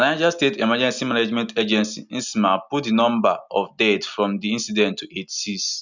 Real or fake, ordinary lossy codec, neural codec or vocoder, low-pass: real; none; none; 7.2 kHz